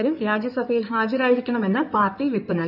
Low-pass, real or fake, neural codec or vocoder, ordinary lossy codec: 5.4 kHz; fake; codec, 16 kHz in and 24 kHz out, 2.2 kbps, FireRedTTS-2 codec; none